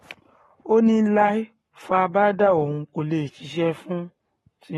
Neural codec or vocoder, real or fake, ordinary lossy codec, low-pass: codec, 44.1 kHz, 7.8 kbps, Pupu-Codec; fake; AAC, 32 kbps; 19.8 kHz